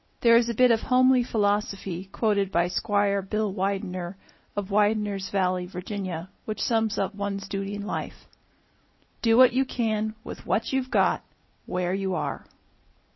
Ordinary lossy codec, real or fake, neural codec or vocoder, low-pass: MP3, 24 kbps; real; none; 7.2 kHz